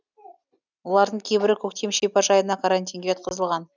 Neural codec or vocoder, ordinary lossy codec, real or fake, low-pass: none; none; real; 7.2 kHz